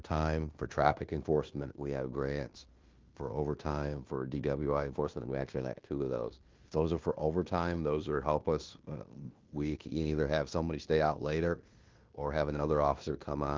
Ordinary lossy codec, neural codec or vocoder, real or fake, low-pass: Opus, 32 kbps; codec, 16 kHz in and 24 kHz out, 0.9 kbps, LongCat-Audio-Codec, fine tuned four codebook decoder; fake; 7.2 kHz